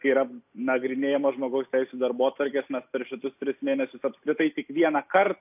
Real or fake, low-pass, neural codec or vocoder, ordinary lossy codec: real; 3.6 kHz; none; AAC, 32 kbps